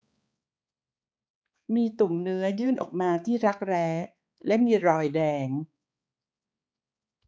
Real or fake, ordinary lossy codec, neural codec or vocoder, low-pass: fake; none; codec, 16 kHz, 4 kbps, X-Codec, HuBERT features, trained on balanced general audio; none